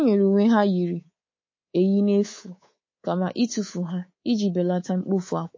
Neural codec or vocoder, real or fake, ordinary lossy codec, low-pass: codec, 24 kHz, 3.1 kbps, DualCodec; fake; MP3, 32 kbps; 7.2 kHz